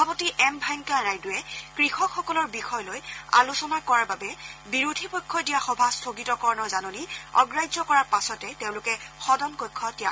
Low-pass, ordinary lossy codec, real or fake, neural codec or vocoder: none; none; real; none